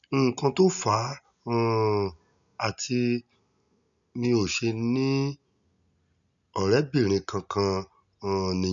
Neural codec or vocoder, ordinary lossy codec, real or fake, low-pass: none; none; real; 7.2 kHz